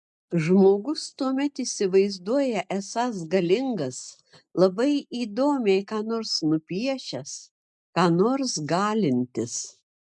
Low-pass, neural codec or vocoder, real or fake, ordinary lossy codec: 10.8 kHz; none; real; MP3, 96 kbps